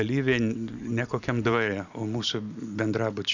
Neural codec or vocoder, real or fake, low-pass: none; real; 7.2 kHz